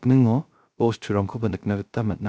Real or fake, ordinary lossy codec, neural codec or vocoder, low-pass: fake; none; codec, 16 kHz, 0.3 kbps, FocalCodec; none